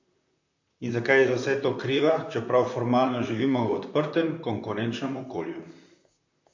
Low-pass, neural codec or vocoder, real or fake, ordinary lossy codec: 7.2 kHz; vocoder, 44.1 kHz, 128 mel bands, Pupu-Vocoder; fake; MP3, 48 kbps